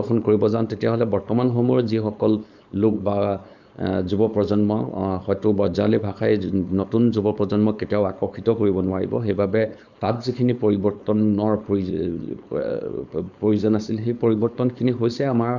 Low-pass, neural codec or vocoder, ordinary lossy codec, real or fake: 7.2 kHz; codec, 16 kHz, 4.8 kbps, FACodec; Opus, 64 kbps; fake